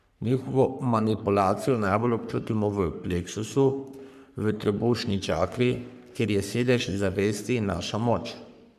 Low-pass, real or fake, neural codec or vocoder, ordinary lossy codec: 14.4 kHz; fake; codec, 44.1 kHz, 3.4 kbps, Pupu-Codec; none